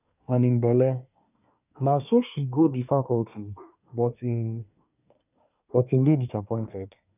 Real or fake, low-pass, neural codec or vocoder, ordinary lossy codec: fake; 3.6 kHz; codec, 24 kHz, 1 kbps, SNAC; none